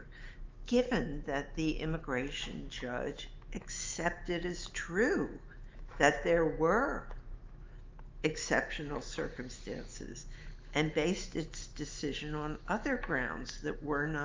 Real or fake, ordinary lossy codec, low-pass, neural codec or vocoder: fake; Opus, 24 kbps; 7.2 kHz; autoencoder, 48 kHz, 128 numbers a frame, DAC-VAE, trained on Japanese speech